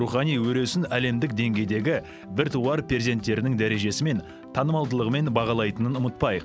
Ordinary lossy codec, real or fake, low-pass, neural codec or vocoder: none; real; none; none